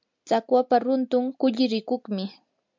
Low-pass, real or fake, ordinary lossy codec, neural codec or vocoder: 7.2 kHz; real; MP3, 64 kbps; none